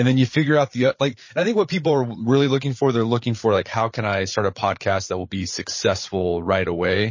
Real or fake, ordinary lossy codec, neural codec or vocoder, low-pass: fake; MP3, 32 kbps; codec, 16 kHz, 8 kbps, FreqCodec, larger model; 7.2 kHz